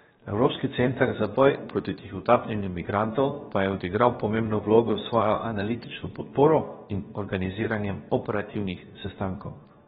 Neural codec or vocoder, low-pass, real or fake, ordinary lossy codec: codec, 16 kHz, 0.7 kbps, FocalCodec; 7.2 kHz; fake; AAC, 16 kbps